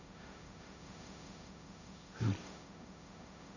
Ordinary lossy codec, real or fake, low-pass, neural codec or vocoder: none; fake; 7.2 kHz; codec, 16 kHz, 1.1 kbps, Voila-Tokenizer